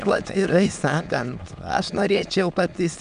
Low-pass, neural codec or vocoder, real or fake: 9.9 kHz; autoencoder, 22.05 kHz, a latent of 192 numbers a frame, VITS, trained on many speakers; fake